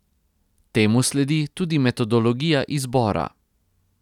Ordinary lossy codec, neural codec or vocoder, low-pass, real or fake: none; none; 19.8 kHz; real